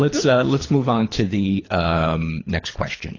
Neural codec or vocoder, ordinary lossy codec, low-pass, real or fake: codec, 24 kHz, 3 kbps, HILCodec; AAC, 32 kbps; 7.2 kHz; fake